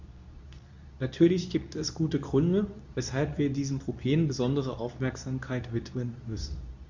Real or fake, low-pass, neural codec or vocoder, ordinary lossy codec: fake; 7.2 kHz; codec, 24 kHz, 0.9 kbps, WavTokenizer, medium speech release version 2; none